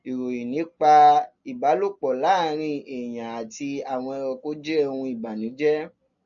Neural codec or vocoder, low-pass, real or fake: none; 7.2 kHz; real